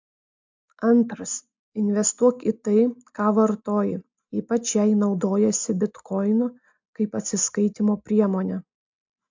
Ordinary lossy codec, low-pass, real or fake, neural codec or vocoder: MP3, 64 kbps; 7.2 kHz; real; none